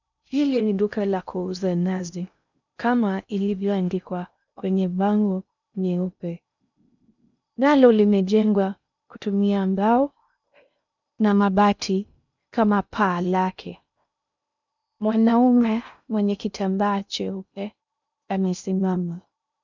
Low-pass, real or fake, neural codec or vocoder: 7.2 kHz; fake; codec, 16 kHz in and 24 kHz out, 0.6 kbps, FocalCodec, streaming, 2048 codes